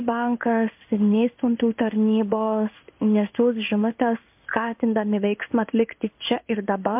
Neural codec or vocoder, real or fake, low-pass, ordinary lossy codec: codec, 16 kHz in and 24 kHz out, 1 kbps, XY-Tokenizer; fake; 3.6 kHz; MP3, 32 kbps